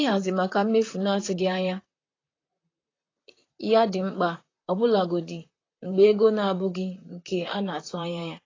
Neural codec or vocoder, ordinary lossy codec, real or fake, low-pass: vocoder, 44.1 kHz, 128 mel bands, Pupu-Vocoder; AAC, 32 kbps; fake; 7.2 kHz